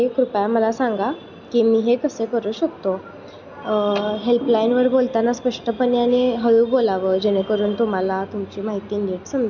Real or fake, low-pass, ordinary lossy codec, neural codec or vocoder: real; 7.2 kHz; none; none